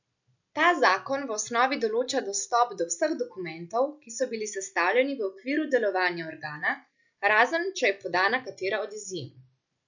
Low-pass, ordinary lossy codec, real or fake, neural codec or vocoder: 7.2 kHz; none; real; none